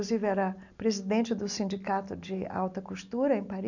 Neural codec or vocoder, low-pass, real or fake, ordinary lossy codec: none; 7.2 kHz; real; none